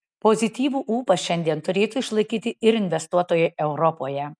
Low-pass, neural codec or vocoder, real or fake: 9.9 kHz; none; real